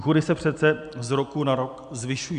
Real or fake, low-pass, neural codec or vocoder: real; 9.9 kHz; none